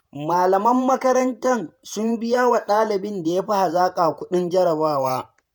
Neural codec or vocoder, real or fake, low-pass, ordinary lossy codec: vocoder, 48 kHz, 128 mel bands, Vocos; fake; none; none